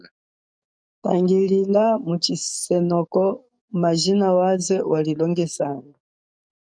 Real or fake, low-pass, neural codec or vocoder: fake; 9.9 kHz; codec, 44.1 kHz, 7.8 kbps, DAC